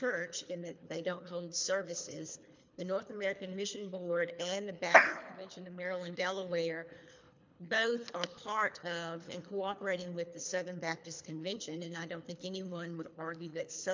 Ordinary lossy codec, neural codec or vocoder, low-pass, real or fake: MP3, 64 kbps; codec, 24 kHz, 3 kbps, HILCodec; 7.2 kHz; fake